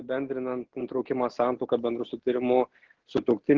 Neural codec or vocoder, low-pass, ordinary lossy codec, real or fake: none; 7.2 kHz; Opus, 16 kbps; real